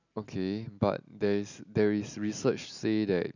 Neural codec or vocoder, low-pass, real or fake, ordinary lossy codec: none; 7.2 kHz; real; none